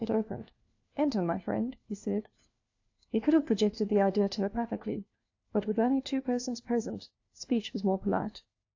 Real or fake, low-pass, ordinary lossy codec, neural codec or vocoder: fake; 7.2 kHz; AAC, 48 kbps; codec, 16 kHz, 1 kbps, FunCodec, trained on LibriTTS, 50 frames a second